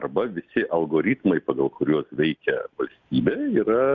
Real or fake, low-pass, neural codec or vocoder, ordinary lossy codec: real; 7.2 kHz; none; Opus, 64 kbps